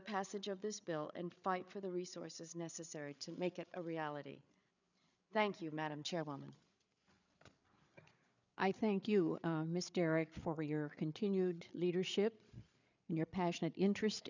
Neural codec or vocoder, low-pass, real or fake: codec, 16 kHz, 8 kbps, FreqCodec, larger model; 7.2 kHz; fake